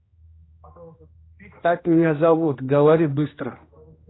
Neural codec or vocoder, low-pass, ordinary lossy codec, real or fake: codec, 16 kHz, 1 kbps, X-Codec, HuBERT features, trained on general audio; 7.2 kHz; AAC, 16 kbps; fake